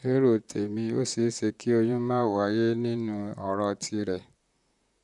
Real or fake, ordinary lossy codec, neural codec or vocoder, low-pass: fake; none; vocoder, 44.1 kHz, 128 mel bands, Pupu-Vocoder; 10.8 kHz